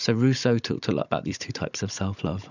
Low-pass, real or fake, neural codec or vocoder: 7.2 kHz; fake; codec, 16 kHz, 16 kbps, FunCodec, trained on Chinese and English, 50 frames a second